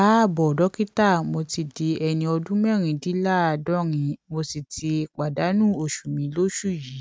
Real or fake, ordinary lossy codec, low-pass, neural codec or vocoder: real; none; none; none